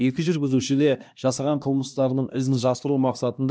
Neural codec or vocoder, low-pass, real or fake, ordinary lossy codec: codec, 16 kHz, 1 kbps, X-Codec, HuBERT features, trained on LibriSpeech; none; fake; none